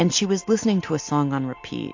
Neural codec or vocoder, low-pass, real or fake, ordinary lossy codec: none; 7.2 kHz; real; AAC, 48 kbps